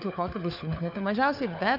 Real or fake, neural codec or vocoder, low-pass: fake; codec, 16 kHz, 4 kbps, FunCodec, trained on LibriTTS, 50 frames a second; 5.4 kHz